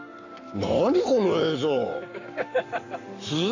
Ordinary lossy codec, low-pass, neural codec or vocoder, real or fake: none; 7.2 kHz; codec, 44.1 kHz, 7.8 kbps, Pupu-Codec; fake